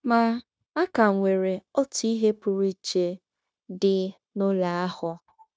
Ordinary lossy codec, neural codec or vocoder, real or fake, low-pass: none; codec, 16 kHz, 0.9 kbps, LongCat-Audio-Codec; fake; none